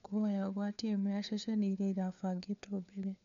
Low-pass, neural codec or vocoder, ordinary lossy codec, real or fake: 7.2 kHz; codec, 16 kHz, 4 kbps, FunCodec, trained on LibriTTS, 50 frames a second; none; fake